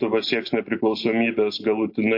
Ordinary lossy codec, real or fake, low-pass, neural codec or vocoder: MP3, 32 kbps; real; 5.4 kHz; none